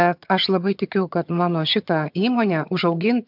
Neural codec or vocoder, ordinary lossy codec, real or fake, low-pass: vocoder, 22.05 kHz, 80 mel bands, HiFi-GAN; MP3, 48 kbps; fake; 5.4 kHz